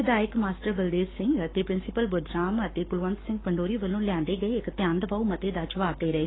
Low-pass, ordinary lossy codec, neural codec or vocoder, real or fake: 7.2 kHz; AAC, 16 kbps; codec, 44.1 kHz, 7.8 kbps, Pupu-Codec; fake